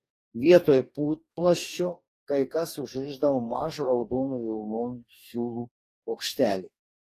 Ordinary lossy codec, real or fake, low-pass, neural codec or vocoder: AAC, 48 kbps; fake; 14.4 kHz; codec, 44.1 kHz, 2.6 kbps, DAC